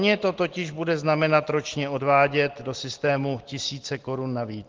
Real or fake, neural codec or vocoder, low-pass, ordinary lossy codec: real; none; 7.2 kHz; Opus, 16 kbps